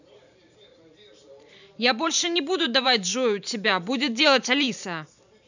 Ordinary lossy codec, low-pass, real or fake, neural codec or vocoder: none; 7.2 kHz; fake; vocoder, 44.1 kHz, 128 mel bands every 512 samples, BigVGAN v2